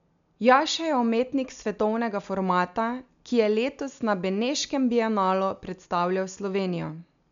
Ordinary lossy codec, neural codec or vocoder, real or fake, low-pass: none; none; real; 7.2 kHz